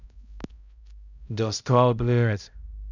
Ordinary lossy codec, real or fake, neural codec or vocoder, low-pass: none; fake; codec, 16 kHz, 0.5 kbps, X-Codec, HuBERT features, trained on balanced general audio; 7.2 kHz